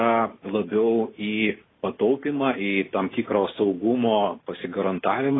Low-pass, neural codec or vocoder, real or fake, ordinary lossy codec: 7.2 kHz; none; real; AAC, 16 kbps